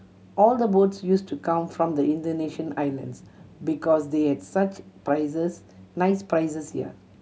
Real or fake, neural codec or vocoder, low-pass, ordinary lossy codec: real; none; none; none